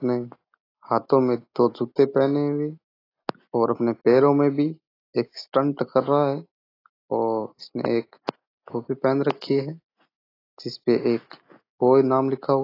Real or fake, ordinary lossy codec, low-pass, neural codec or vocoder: real; AAC, 24 kbps; 5.4 kHz; none